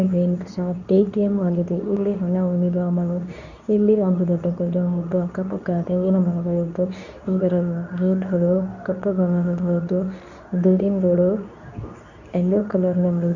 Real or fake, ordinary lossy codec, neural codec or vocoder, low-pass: fake; none; codec, 24 kHz, 0.9 kbps, WavTokenizer, medium speech release version 2; 7.2 kHz